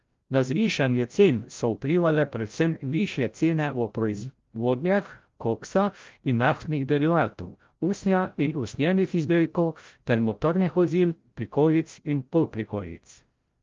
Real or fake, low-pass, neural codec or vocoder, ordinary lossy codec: fake; 7.2 kHz; codec, 16 kHz, 0.5 kbps, FreqCodec, larger model; Opus, 32 kbps